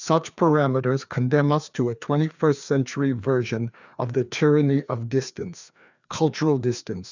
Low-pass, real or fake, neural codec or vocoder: 7.2 kHz; fake; codec, 16 kHz, 2 kbps, FreqCodec, larger model